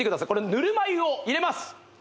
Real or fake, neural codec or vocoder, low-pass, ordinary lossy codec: real; none; none; none